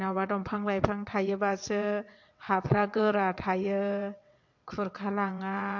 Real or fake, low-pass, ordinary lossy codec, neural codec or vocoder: fake; 7.2 kHz; MP3, 48 kbps; vocoder, 22.05 kHz, 80 mel bands, WaveNeXt